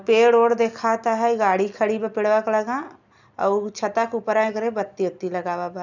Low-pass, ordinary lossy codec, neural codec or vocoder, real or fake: 7.2 kHz; none; none; real